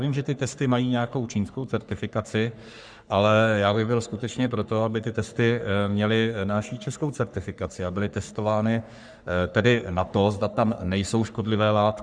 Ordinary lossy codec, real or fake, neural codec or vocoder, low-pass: Opus, 64 kbps; fake; codec, 44.1 kHz, 3.4 kbps, Pupu-Codec; 9.9 kHz